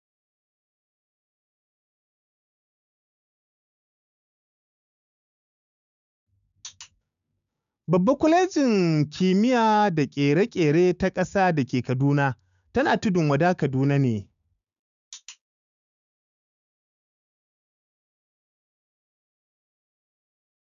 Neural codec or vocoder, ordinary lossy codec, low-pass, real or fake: codec, 16 kHz, 6 kbps, DAC; none; 7.2 kHz; fake